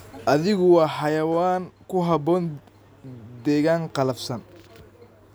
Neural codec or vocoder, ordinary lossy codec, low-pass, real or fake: none; none; none; real